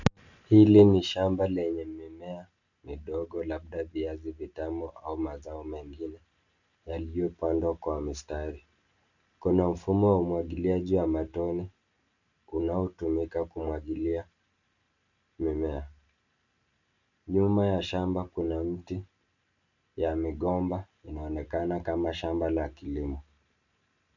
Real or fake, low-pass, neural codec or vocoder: real; 7.2 kHz; none